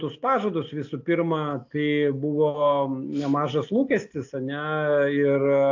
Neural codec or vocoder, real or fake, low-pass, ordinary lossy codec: none; real; 7.2 kHz; AAC, 48 kbps